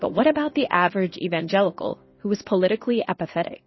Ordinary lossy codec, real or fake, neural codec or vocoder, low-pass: MP3, 24 kbps; fake; codec, 16 kHz in and 24 kHz out, 1 kbps, XY-Tokenizer; 7.2 kHz